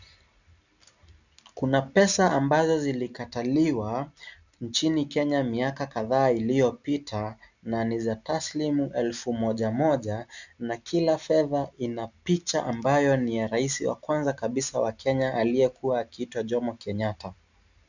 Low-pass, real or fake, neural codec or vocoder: 7.2 kHz; real; none